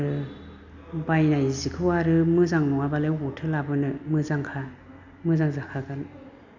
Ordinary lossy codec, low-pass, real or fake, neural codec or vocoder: none; 7.2 kHz; real; none